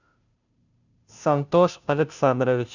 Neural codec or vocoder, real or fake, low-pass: codec, 16 kHz, 0.5 kbps, FunCodec, trained on Chinese and English, 25 frames a second; fake; 7.2 kHz